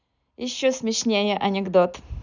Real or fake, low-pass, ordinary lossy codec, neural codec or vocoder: real; 7.2 kHz; none; none